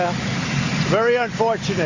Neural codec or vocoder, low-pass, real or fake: none; 7.2 kHz; real